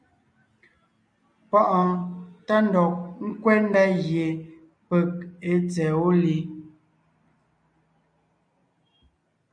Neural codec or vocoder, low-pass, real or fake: none; 9.9 kHz; real